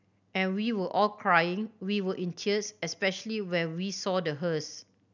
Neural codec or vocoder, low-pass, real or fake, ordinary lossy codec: none; 7.2 kHz; real; none